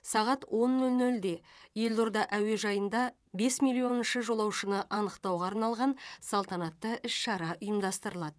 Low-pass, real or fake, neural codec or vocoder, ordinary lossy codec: none; fake; vocoder, 22.05 kHz, 80 mel bands, WaveNeXt; none